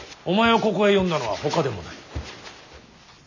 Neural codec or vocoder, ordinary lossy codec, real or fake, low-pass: none; none; real; 7.2 kHz